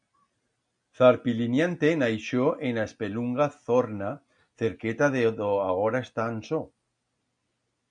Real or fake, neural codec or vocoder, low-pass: real; none; 9.9 kHz